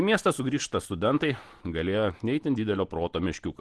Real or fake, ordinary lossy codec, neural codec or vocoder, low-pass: real; Opus, 16 kbps; none; 10.8 kHz